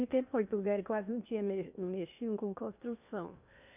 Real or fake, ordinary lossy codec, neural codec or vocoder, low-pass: fake; none; codec, 16 kHz in and 24 kHz out, 0.8 kbps, FocalCodec, streaming, 65536 codes; 3.6 kHz